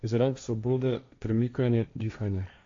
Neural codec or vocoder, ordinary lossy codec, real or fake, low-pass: codec, 16 kHz, 1.1 kbps, Voila-Tokenizer; AAC, 48 kbps; fake; 7.2 kHz